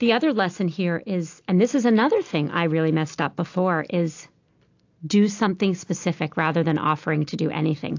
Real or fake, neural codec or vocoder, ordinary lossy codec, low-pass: real; none; AAC, 48 kbps; 7.2 kHz